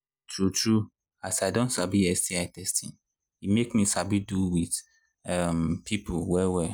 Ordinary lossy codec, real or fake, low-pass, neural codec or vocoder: none; real; none; none